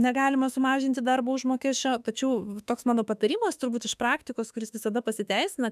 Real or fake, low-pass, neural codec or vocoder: fake; 14.4 kHz; autoencoder, 48 kHz, 32 numbers a frame, DAC-VAE, trained on Japanese speech